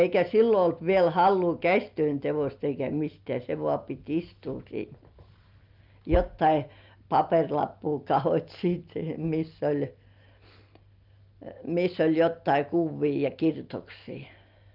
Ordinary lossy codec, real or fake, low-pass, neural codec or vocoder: Opus, 24 kbps; real; 5.4 kHz; none